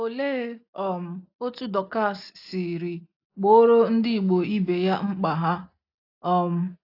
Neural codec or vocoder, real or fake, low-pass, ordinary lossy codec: none; real; 5.4 kHz; AAC, 32 kbps